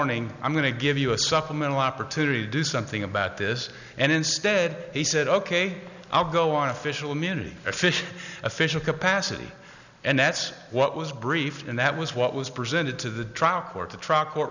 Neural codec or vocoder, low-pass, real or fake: none; 7.2 kHz; real